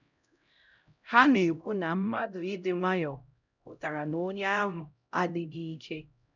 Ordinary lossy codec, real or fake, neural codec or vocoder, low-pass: none; fake; codec, 16 kHz, 0.5 kbps, X-Codec, HuBERT features, trained on LibriSpeech; 7.2 kHz